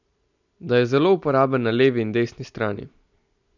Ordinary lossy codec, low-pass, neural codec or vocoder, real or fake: none; 7.2 kHz; none; real